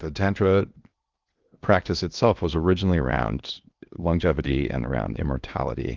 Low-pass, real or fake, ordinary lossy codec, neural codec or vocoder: 7.2 kHz; fake; Opus, 32 kbps; codec, 16 kHz in and 24 kHz out, 0.8 kbps, FocalCodec, streaming, 65536 codes